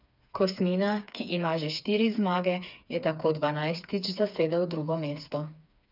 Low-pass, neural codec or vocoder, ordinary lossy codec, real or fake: 5.4 kHz; codec, 16 kHz, 4 kbps, FreqCodec, smaller model; none; fake